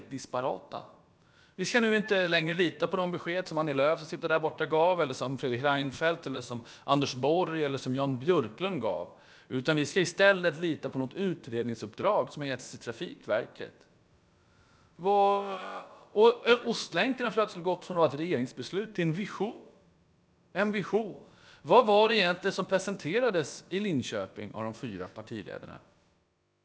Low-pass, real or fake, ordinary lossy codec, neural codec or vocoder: none; fake; none; codec, 16 kHz, about 1 kbps, DyCAST, with the encoder's durations